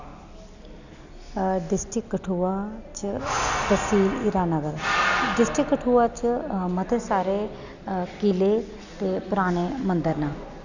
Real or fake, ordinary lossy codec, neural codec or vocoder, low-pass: real; none; none; 7.2 kHz